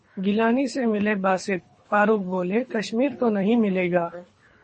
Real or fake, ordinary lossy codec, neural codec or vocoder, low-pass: fake; MP3, 32 kbps; codec, 24 kHz, 3 kbps, HILCodec; 10.8 kHz